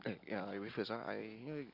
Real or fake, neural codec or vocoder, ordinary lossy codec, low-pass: real; none; none; 5.4 kHz